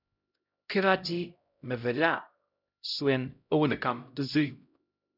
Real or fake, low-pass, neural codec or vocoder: fake; 5.4 kHz; codec, 16 kHz, 0.5 kbps, X-Codec, HuBERT features, trained on LibriSpeech